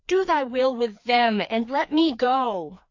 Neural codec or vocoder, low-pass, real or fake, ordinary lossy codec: codec, 16 kHz, 2 kbps, FreqCodec, larger model; 7.2 kHz; fake; AAC, 48 kbps